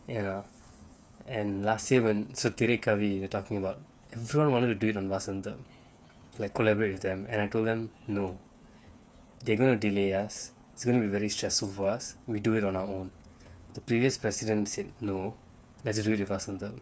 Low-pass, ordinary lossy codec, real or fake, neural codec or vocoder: none; none; fake; codec, 16 kHz, 8 kbps, FreqCodec, smaller model